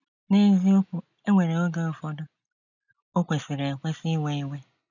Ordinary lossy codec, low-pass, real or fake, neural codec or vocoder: none; 7.2 kHz; real; none